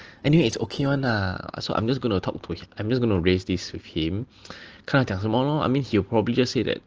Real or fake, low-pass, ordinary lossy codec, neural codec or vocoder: real; 7.2 kHz; Opus, 16 kbps; none